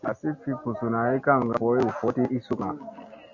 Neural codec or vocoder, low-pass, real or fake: none; 7.2 kHz; real